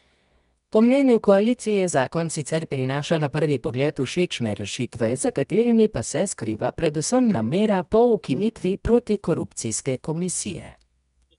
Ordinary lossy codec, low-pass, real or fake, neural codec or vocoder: none; 10.8 kHz; fake; codec, 24 kHz, 0.9 kbps, WavTokenizer, medium music audio release